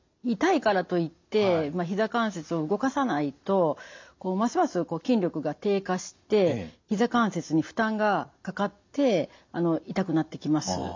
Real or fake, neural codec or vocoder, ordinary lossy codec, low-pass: real; none; MP3, 64 kbps; 7.2 kHz